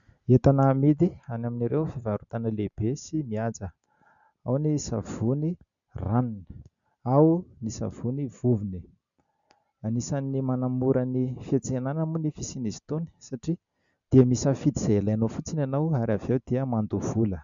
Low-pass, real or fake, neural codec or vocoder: 7.2 kHz; real; none